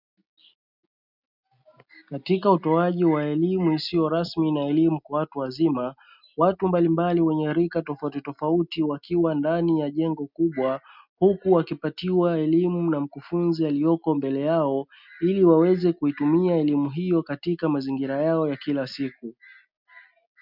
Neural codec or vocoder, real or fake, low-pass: none; real; 5.4 kHz